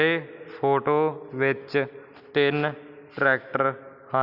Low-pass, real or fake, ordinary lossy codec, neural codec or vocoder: 5.4 kHz; real; none; none